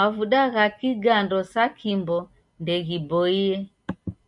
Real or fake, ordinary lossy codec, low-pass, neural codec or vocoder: real; MP3, 96 kbps; 10.8 kHz; none